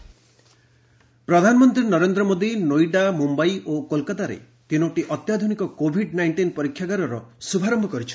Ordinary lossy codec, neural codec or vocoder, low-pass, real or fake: none; none; none; real